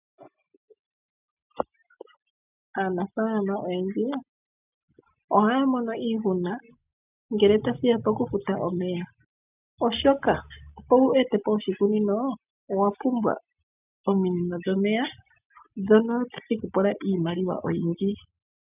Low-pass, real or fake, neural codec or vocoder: 3.6 kHz; real; none